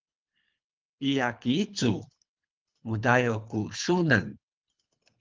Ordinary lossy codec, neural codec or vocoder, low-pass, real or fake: Opus, 32 kbps; codec, 24 kHz, 3 kbps, HILCodec; 7.2 kHz; fake